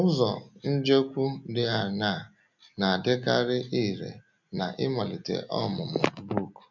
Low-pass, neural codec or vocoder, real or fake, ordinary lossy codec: 7.2 kHz; none; real; MP3, 64 kbps